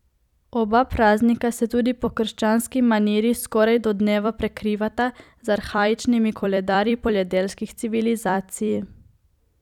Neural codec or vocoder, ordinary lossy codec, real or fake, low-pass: vocoder, 44.1 kHz, 128 mel bands every 256 samples, BigVGAN v2; none; fake; 19.8 kHz